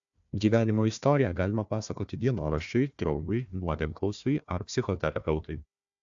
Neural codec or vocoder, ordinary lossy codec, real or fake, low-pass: codec, 16 kHz, 1 kbps, FunCodec, trained on Chinese and English, 50 frames a second; AAC, 64 kbps; fake; 7.2 kHz